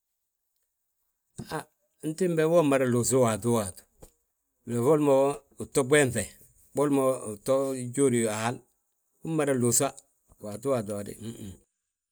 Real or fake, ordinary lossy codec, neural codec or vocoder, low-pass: real; none; none; none